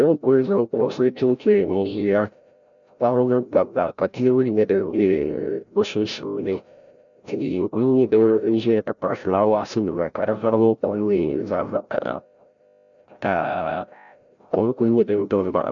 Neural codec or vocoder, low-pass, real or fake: codec, 16 kHz, 0.5 kbps, FreqCodec, larger model; 7.2 kHz; fake